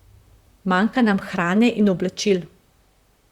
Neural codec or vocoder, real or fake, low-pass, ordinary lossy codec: vocoder, 44.1 kHz, 128 mel bands, Pupu-Vocoder; fake; 19.8 kHz; Opus, 64 kbps